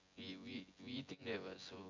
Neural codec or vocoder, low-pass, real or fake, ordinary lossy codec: vocoder, 24 kHz, 100 mel bands, Vocos; 7.2 kHz; fake; MP3, 48 kbps